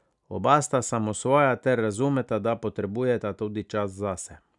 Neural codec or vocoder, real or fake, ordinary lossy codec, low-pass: none; real; none; 10.8 kHz